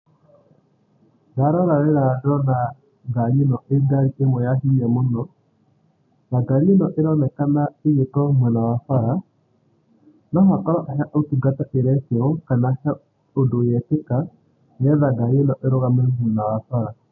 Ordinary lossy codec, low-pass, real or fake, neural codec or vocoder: none; 7.2 kHz; real; none